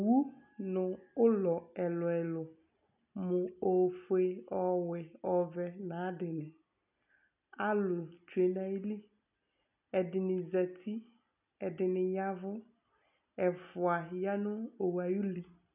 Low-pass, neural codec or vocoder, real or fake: 3.6 kHz; none; real